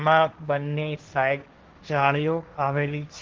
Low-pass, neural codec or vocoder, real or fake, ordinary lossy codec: 7.2 kHz; codec, 16 kHz, 1.1 kbps, Voila-Tokenizer; fake; Opus, 16 kbps